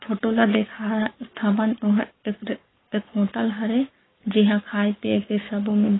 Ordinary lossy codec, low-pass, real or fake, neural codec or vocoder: AAC, 16 kbps; 7.2 kHz; fake; codec, 44.1 kHz, 7.8 kbps, Pupu-Codec